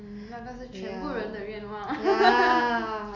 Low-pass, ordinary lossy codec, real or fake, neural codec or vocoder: 7.2 kHz; none; real; none